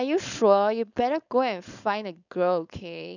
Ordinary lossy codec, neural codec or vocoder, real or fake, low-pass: none; codec, 16 kHz, 4.8 kbps, FACodec; fake; 7.2 kHz